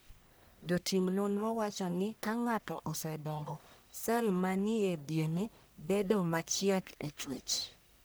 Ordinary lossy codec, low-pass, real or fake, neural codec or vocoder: none; none; fake; codec, 44.1 kHz, 1.7 kbps, Pupu-Codec